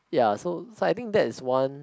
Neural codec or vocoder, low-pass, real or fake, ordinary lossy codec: none; none; real; none